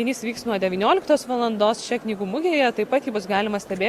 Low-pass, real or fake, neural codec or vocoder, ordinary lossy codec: 19.8 kHz; real; none; Opus, 64 kbps